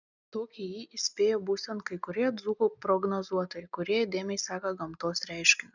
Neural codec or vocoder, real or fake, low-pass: none; real; 7.2 kHz